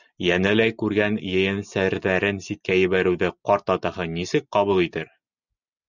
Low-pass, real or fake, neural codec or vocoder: 7.2 kHz; real; none